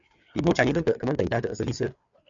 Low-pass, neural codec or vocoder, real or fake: 7.2 kHz; codec, 16 kHz, 4 kbps, FreqCodec, larger model; fake